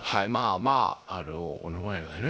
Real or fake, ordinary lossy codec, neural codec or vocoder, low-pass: fake; none; codec, 16 kHz, 0.3 kbps, FocalCodec; none